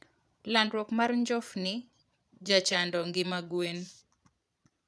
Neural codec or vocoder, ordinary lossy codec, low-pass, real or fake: vocoder, 22.05 kHz, 80 mel bands, Vocos; none; none; fake